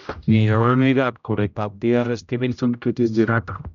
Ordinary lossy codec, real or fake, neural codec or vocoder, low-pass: none; fake; codec, 16 kHz, 0.5 kbps, X-Codec, HuBERT features, trained on general audio; 7.2 kHz